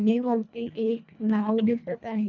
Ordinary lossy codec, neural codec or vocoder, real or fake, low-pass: none; codec, 24 kHz, 1.5 kbps, HILCodec; fake; 7.2 kHz